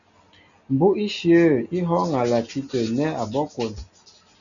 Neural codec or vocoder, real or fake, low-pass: none; real; 7.2 kHz